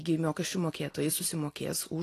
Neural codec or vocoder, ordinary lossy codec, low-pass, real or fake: none; AAC, 48 kbps; 14.4 kHz; real